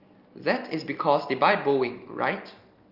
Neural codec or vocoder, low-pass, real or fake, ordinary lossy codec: none; 5.4 kHz; real; Opus, 24 kbps